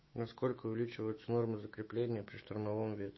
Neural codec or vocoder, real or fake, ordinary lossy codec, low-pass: autoencoder, 48 kHz, 128 numbers a frame, DAC-VAE, trained on Japanese speech; fake; MP3, 24 kbps; 7.2 kHz